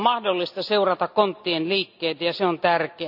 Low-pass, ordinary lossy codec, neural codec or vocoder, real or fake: 5.4 kHz; none; none; real